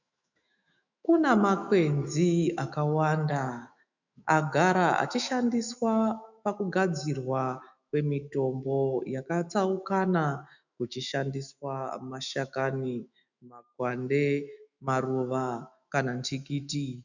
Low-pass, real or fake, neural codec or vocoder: 7.2 kHz; fake; autoencoder, 48 kHz, 128 numbers a frame, DAC-VAE, trained on Japanese speech